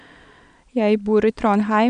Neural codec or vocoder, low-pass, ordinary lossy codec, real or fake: none; 9.9 kHz; none; real